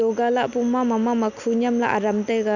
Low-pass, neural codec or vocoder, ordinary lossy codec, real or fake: 7.2 kHz; none; none; real